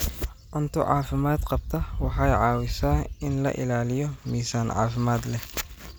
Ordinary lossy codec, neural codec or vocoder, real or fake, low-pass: none; none; real; none